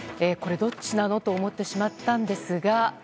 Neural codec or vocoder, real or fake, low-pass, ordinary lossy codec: none; real; none; none